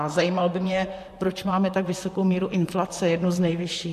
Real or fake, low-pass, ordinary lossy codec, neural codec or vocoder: fake; 14.4 kHz; MP3, 64 kbps; vocoder, 44.1 kHz, 128 mel bands, Pupu-Vocoder